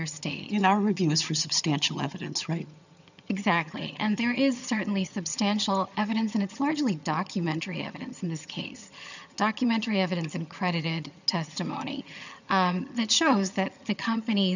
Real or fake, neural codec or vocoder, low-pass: fake; vocoder, 22.05 kHz, 80 mel bands, HiFi-GAN; 7.2 kHz